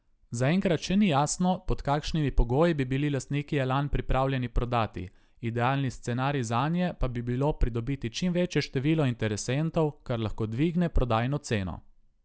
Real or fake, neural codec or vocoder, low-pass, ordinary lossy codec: real; none; none; none